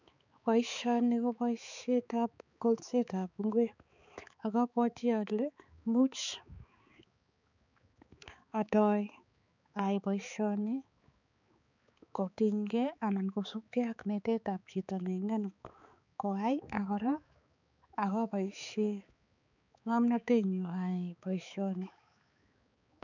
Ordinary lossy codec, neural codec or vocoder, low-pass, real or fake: none; codec, 16 kHz, 4 kbps, X-Codec, HuBERT features, trained on balanced general audio; 7.2 kHz; fake